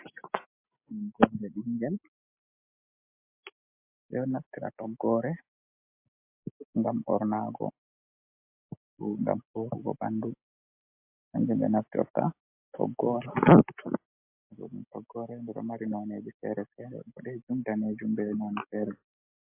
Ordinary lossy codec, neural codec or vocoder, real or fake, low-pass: MP3, 32 kbps; none; real; 3.6 kHz